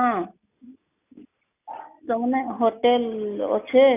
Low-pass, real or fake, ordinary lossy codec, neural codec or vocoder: 3.6 kHz; real; none; none